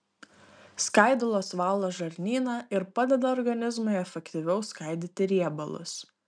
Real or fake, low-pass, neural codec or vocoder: real; 9.9 kHz; none